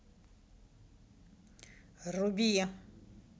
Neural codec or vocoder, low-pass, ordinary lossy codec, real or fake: none; none; none; real